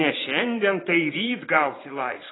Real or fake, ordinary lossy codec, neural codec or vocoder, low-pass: fake; AAC, 16 kbps; vocoder, 22.05 kHz, 80 mel bands, Vocos; 7.2 kHz